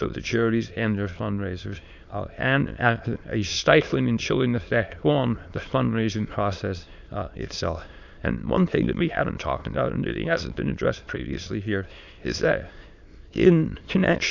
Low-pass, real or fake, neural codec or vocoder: 7.2 kHz; fake; autoencoder, 22.05 kHz, a latent of 192 numbers a frame, VITS, trained on many speakers